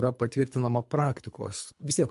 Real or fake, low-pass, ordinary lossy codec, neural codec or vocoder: fake; 10.8 kHz; MP3, 64 kbps; codec, 24 kHz, 3 kbps, HILCodec